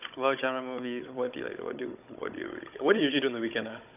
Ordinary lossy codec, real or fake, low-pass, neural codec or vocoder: none; fake; 3.6 kHz; codec, 16 kHz, 16 kbps, FunCodec, trained on Chinese and English, 50 frames a second